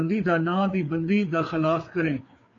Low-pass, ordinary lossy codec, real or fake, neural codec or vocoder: 7.2 kHz; AAC, 48 kbps; fake; codec, 16 kHz, 4 kbps, FreqCodec, larger model